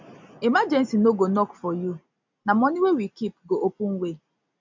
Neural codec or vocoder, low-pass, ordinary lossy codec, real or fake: none; 7.2 kHz; MP3, 64 kbps; real